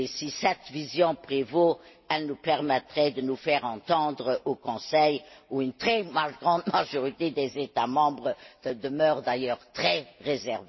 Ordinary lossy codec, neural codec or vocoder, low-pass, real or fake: MP3, 24 kbps; none; 7.2 kHz; real